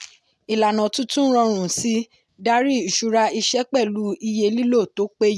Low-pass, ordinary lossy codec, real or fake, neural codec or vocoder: none; none; real; none